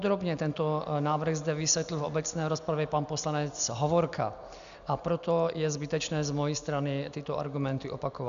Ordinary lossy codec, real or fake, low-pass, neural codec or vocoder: MP3, 96 kbps; real; 7.2 kHz; none